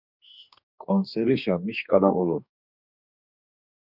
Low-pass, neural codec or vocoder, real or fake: 5.4 kHz; codec, 44.1 kHz, 2.6 kbps, DAC; fake